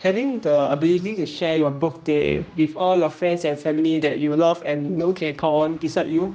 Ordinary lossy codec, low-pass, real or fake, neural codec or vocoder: none; none; fake; codec, 16 kHz, 1 kbps, X-Codec, HuBERT features, trained on general audio